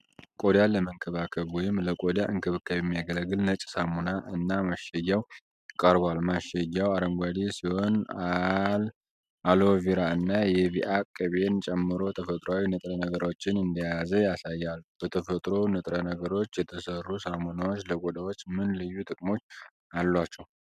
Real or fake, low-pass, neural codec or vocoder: real; 14.4 kHz; none